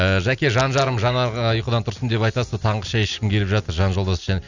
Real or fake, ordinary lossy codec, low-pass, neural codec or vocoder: real; none; 7.2 kHz; none